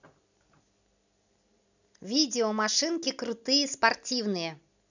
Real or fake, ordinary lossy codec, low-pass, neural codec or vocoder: real; none; 7.2 kHz; none